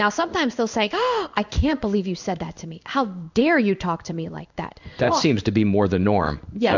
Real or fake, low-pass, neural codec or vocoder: fake; 7.2 kHz; codec, 16 kHz in and 24 kHz out, 1 kbps, XY-Tokenizer